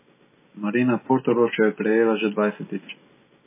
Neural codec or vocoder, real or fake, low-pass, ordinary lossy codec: none; real; 3.6 kHz; MP3, 16 kbps